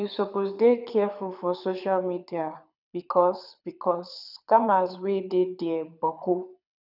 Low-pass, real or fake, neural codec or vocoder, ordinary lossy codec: 5.4 kHz; fake; codec, 24 kHz, 6 kbps, HILCodec; none